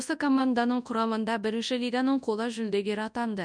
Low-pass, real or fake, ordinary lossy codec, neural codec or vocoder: 9.9 kHz; fake; none; codec, 24 kHz, 0.9 kbps, WavTokenizer, large speech release